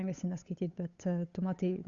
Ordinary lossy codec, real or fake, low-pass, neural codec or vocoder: Opus, 64 kbps; fake; 7.2 kHz; codec, 16 kHz, 8 kbps, FunCodec, trained on Chinese and English, 25 frames a second